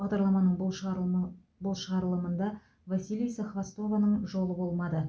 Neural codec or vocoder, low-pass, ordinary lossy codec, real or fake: none; none; none; real